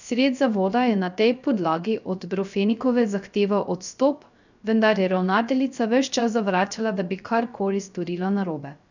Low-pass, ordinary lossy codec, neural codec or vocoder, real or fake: 7.2 kHz; none; codec, 16 kHz, 0.3 kbps, FocalCodec; fake